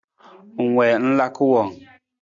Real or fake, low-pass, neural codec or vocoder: real; 7.2 kHz; none